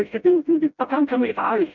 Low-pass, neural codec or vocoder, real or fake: 7.2 kHz; codec, 16 kHz, 0.5 kbps, FreqCodec, smaller model; fake